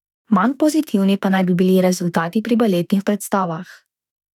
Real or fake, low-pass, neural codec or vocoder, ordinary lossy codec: fake; 19.8 kHz; autoencoder, 48 kHz, 32 numbers a frame, DAC-VAE, trained on Japanese speech; none